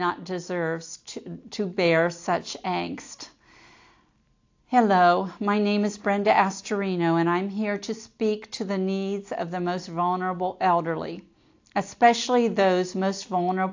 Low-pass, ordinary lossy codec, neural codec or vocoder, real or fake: 7.2 kHz; AAC, 48 kbps; none; real